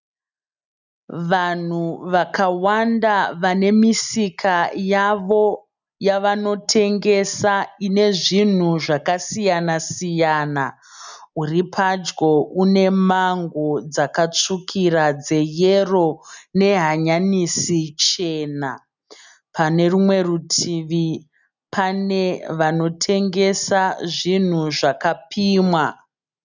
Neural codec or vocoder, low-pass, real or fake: none; 7.2 kHz; real